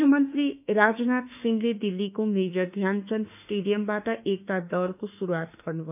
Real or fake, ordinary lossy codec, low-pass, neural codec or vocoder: fake; none; 3.6 kHz; autoencoder, 48 kHz, 32 numbers a frame, DAC-VAE, trained on Japanese speech